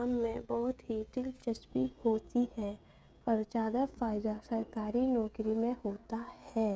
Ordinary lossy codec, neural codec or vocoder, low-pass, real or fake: none; codec, 16 kHz, 16 kbps, FreqCodec, smaller model; none; fake